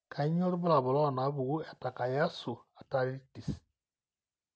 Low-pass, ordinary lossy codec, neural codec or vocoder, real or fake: none; none; none; real